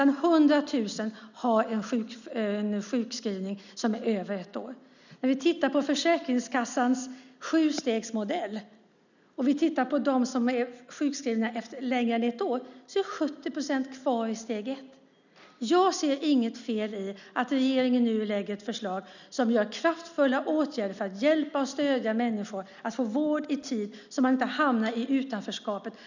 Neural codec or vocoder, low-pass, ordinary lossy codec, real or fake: none; 7.2 kHz; none; real